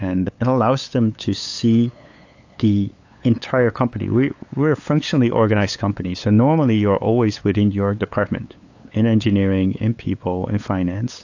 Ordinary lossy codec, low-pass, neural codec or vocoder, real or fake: AAC, 48 kbps; 7.2 kHz; codec, 16 kHz, 8 kbps, FunCodec, trained on LibriTTS, 25 frames a second; fake